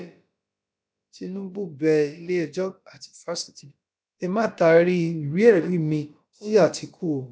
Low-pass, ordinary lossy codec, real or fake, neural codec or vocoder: none; none; fake; codec, 16 kHz, about 1 kbps, DyCAST, with the encoder's durations